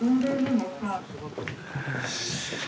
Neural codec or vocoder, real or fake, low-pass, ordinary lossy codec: none; real; none; none